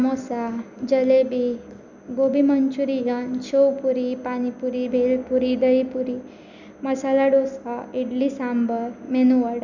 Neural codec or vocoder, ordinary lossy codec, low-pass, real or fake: none; none; 7.2 kHz; real